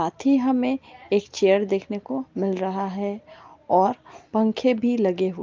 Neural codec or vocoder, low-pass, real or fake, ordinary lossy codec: none; 7.2 kHz; real; Opus, 24 kbps